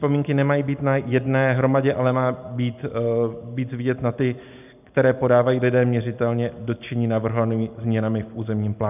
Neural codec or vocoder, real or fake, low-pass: none; real; 3.6 kHz